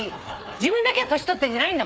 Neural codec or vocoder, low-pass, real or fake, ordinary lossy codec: codec, 16 kHz, 4 kbps, FreqCodec, larger model; none; fake; none